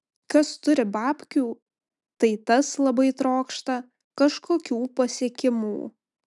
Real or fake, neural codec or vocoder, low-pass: real; none; 10.8 kHz